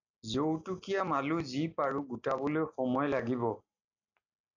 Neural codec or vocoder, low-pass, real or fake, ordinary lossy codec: none; 7.2 kHz; real; AAC, 48 kbps